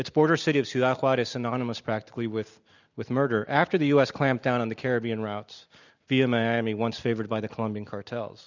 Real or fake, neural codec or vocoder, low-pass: real; none; 7.2 kHz